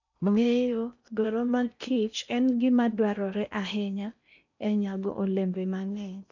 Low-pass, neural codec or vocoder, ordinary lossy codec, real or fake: 7.2 kHz; codec, 16 kHz in and 24 kHz out, 0.8 kbps, FocalCodec, streaming, 65536 codes; none; fake